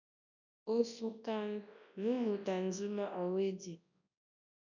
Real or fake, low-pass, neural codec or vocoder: fake; 7.2 kHz; codec, 24 kHz, 0.9 kbps, WavTokenizer, large speech release